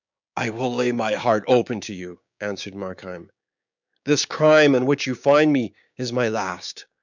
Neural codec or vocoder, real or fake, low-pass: codec, 16 kHz, 6 kbps, DAC; fake; 7.2 kHz